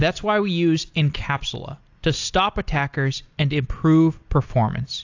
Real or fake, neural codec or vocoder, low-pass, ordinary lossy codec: real; none; 7.2 kHz; AAC, 48 kbps